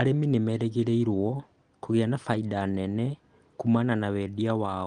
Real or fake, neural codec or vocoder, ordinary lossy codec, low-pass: real; none; Opus, 24 kbps; 9.9 kHz